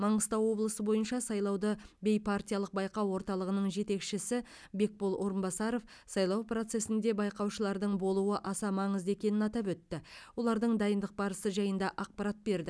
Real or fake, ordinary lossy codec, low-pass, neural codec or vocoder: real; none; none; none